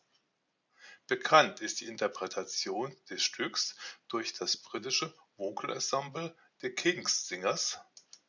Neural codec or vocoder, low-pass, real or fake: none; 7.2 kHz; real